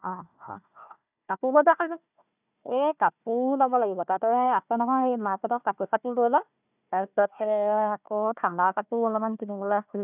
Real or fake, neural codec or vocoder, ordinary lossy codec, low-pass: fake; codec, 16 kHz, 1 kbps, FunCodec, trained on Chinese and English, 50 frames a second; none; 3.6 kHz